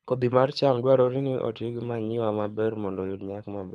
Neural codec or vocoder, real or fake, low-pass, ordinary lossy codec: codec, 24 kHz, 6 kbps, HILCodec; fake; none; none